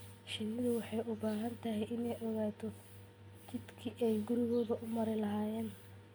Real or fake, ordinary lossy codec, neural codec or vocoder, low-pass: real; none; none; none